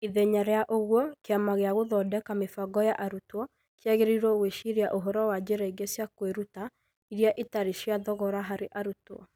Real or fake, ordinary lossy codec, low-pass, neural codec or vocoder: real; none; none; none